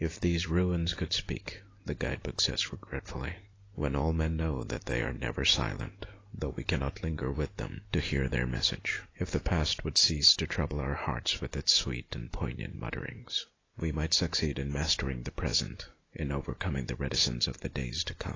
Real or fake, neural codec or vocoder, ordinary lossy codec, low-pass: real; none; AAC, 32 kbps; 7.2 kHz